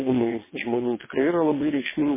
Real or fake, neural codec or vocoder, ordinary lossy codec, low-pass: fake; vocoder, 22.05 kHz, 80 mel bands, WaveNeXt; MP3, 16 kbps; 3.6 kHz